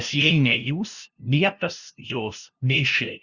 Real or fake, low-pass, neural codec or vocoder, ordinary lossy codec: fake; 7.2 kHz; codec, 16 kHz, 0.5 kbps, FunCodec, trained on LibriTTS, 25 frames a second; Opus, 64 kbps